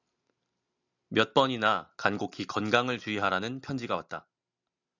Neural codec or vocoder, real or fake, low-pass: none; real; 7.2 kHz